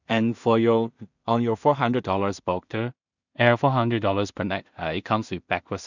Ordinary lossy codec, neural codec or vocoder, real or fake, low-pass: none; codec, 16 kHz in and 24 kHz out, 0.4 kbps, LongCat-Audio-Codec, two codebook decoder; fake; 7.2 kHz